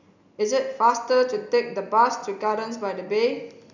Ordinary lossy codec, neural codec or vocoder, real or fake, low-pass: none; none; real; 7.2 kHz